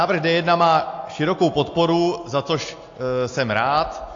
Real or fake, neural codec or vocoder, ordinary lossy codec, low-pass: real; none; AAC, 48 kbps; 7.2 kHz